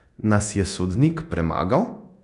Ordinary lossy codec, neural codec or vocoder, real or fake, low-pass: none; codec, 24 kHz, 0.9 kbps, DualCodec; fake; 10.8 kHz